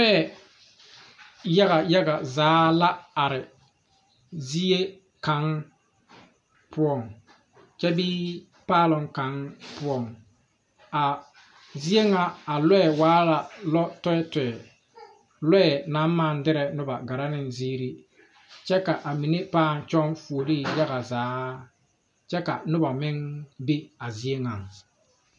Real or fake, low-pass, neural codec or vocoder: real; 10.8 kHz; none